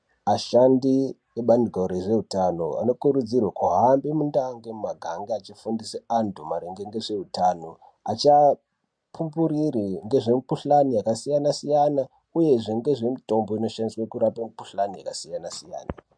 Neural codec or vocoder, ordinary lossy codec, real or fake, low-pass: none; MP3, 64 kbps; real; 9.9 kHz